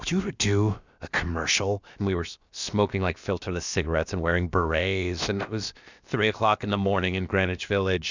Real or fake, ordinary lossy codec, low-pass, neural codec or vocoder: fake; Opus, 64 kbps; 7.2 kHz; codec, 16 kHz, about 1 kbps, DyCAST, with the encoder's durations